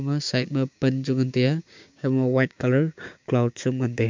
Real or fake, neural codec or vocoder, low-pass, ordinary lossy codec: fake; codec, 16 kHz, 6 kbps, DAC; 7.2 kHz; MP3, 64 kbps